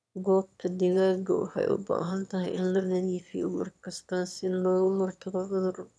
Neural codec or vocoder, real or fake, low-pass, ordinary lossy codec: autoencoder, 22.05 kHz, a latent of 192 numbers a frame, VITS, trained on one speaker; fake; none; none